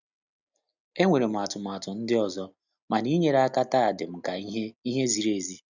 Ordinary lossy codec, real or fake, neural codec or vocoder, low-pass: none; real; none; 7.2 kHz